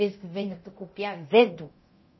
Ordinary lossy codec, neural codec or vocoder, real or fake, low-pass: MP3, 24 kbps; codec, 24 kHz, 0.9 kbps, DualCodec; fake; 7.2 kHz